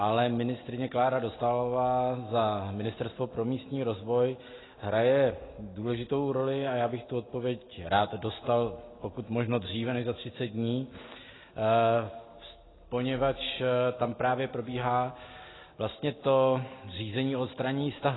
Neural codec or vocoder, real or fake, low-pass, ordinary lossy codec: none; real; 7.2 kHz; AAC, 16 kbps